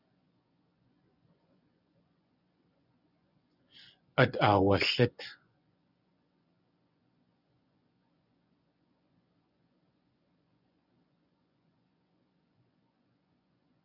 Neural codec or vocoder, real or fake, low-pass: vocoder, 24 kHz, 100 mel bands, Vocos; fake; 5.4 kHz